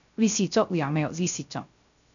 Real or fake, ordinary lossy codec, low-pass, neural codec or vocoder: fake; MP3, 96 kbps; 7.2 kHz; codec, 16 kHz, 0.3 kbps, FocalCodec